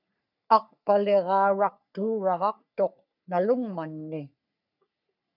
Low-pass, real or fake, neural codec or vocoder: 5.4 kHz; fake; codec, 44.1 kHz, 3.4 kbps, Pupu-Codec